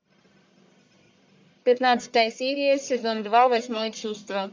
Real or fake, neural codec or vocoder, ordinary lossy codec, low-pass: fake; codec, 44.1 kHz, 1.7 kbps, Pupu-Codec; MP3, 64 kbps; 7.2 kHz